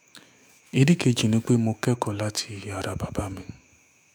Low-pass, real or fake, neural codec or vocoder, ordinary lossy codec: none; fake; autoencoder, 48 kHz, 128 numbers a frame, DAC-VAE, trained on Japanese speech; none